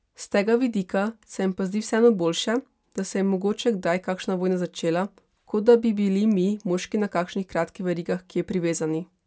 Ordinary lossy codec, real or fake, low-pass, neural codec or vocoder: none; real; none; none